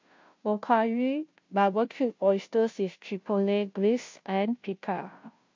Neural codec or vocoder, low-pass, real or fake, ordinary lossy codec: codec, 16 kHz, 0.5 kbps, FunCodec, trained on Chinese and English, 25 frames a second; 7.2 kHz; fake; MP3, 48 kbps